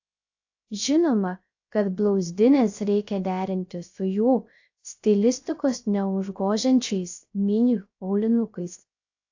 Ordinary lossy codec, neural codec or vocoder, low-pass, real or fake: AAC, 48 kbps; codec, 16 kHz, 0.3 kbps, FocalCodec; 7.2 kHz; fake